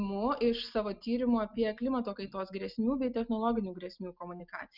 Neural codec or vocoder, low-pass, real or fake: none; 5.4 kHz; real